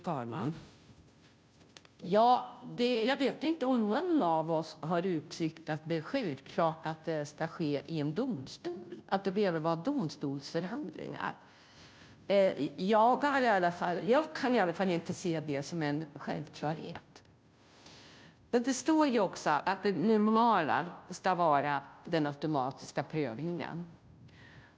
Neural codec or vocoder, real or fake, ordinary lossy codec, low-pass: codec, 16 kHz, 0.5 kbps, FunCodec, trained on Chinese and English, 25 frames a second; fake; none; none